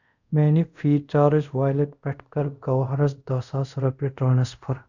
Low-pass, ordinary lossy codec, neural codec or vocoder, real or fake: 7.2 kHz; none; codec, 24 kHz, 0.5 kbps, DualCodec; fake